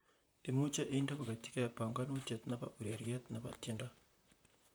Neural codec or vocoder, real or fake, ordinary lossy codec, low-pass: vocoder, 44.1 kHz, 128 mel bands, Pupu-Vocoder; fake; none; none